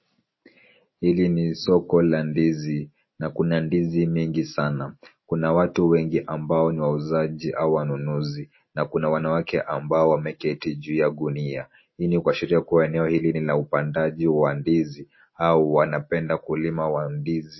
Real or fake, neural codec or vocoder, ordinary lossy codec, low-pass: real; none; MP3, 24 kbps; 7.2 kHz